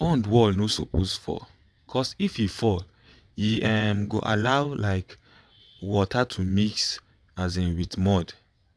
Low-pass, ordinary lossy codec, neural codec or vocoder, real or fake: none; none; vocoder, 22.05 kHz, 80 mel bands, WaveNeXt; fake